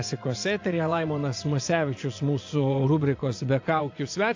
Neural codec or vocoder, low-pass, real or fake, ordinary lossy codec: vocoder, 24 kHz, 100 mel bands, Vocos; 7.2 kHz; fake; AAC, 48 kbps